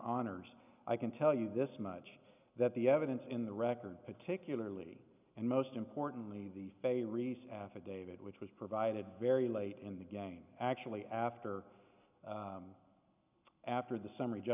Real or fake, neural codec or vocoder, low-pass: real; none; 3.6 kHz